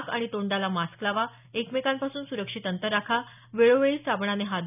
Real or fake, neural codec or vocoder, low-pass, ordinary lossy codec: real; none; 3.6 kHz; none